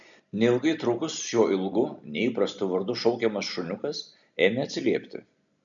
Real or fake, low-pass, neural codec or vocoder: real; 7.2 kHz; none